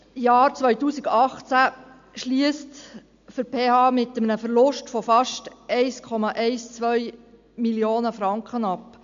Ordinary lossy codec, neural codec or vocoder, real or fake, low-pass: AAC, 64 kbps; none; real; 7.2 kHz